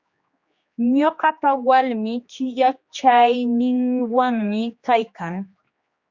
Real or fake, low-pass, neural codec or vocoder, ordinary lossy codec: fake; 7.2 kHz; codec, 16 kHz, 2 kbps, X-Codec, HuBERT features, trained on general audio; Opus, 64 kbps